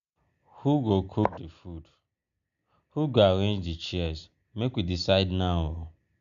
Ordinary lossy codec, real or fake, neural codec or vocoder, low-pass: none; real; none; 7.2 kHz